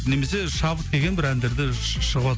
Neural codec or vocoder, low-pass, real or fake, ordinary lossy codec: none; none; real; none